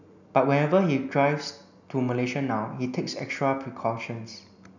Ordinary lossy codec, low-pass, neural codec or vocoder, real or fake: none; 7.2 kHz; none; real